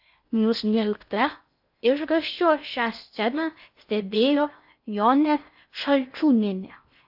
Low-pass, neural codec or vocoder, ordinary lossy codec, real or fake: 5.4 kHz; codec, 16 kHz in and 24 kHz out, 0.6 kbps, FocalCodec, streaming, 4096 codes; AAC, 48 kbps; fake